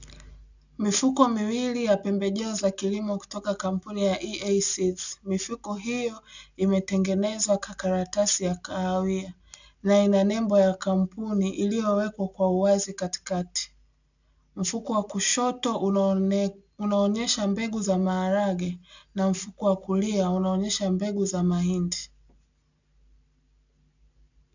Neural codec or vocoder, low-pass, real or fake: none; 7.2 kHz; real